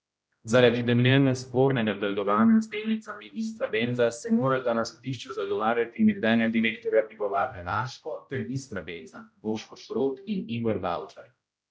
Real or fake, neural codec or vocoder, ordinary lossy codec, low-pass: fake; codec, 16 kHz, 0.5 kbps, X-Codec, HuBERT features, trained on general audio; none; none